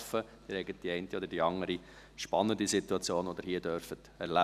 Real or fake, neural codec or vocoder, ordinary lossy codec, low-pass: real; none; none; 14.4 kHz